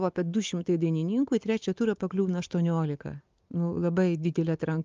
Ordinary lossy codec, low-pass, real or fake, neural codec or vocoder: Opus, 32 kbps; 7.2 kHz; real; none